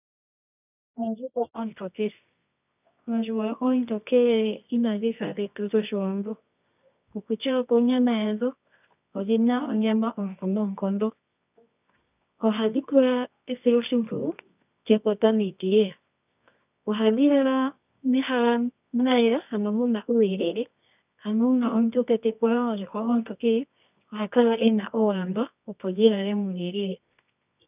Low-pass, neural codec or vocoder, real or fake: 3.6 kHz; codec, 24 kHz, 0.9 kbps, WavTokenizer, medium music audio release; fake